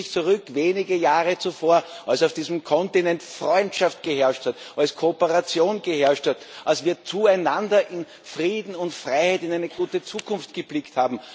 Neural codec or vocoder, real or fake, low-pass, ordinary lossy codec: none; real; none; none